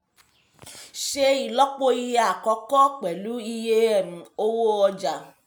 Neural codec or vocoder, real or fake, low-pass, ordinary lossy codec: none; real; none; none